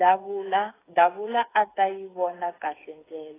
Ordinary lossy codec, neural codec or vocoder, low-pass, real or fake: AAC, 16 kbps; codec, 16 kHz, 8 kbps, FreqCodec, smaller model; 3.6 kHz; fake